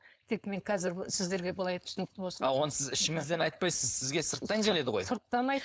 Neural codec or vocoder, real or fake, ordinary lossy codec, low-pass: codec, 16 kHz, 4.8 kbps, FACodec; fake; none; none